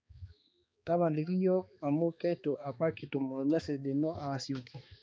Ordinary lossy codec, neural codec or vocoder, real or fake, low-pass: none; codec, 16 kHz, 2 kbps, X-Codec, HuBERT features, trained on balanced general audio; fake; none